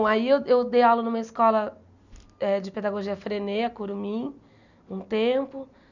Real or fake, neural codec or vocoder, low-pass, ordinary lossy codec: real; none; 7.2 kHz; none